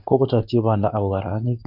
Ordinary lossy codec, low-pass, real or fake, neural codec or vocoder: none; 5.4 kHz; fake; codec, 24 kHz, 0.9 kbps, WavTokenizer, medium speech release version 2